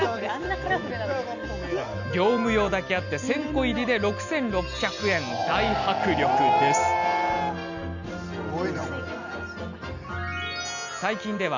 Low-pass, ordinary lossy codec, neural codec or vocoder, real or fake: 7.2 kHz; none; none; real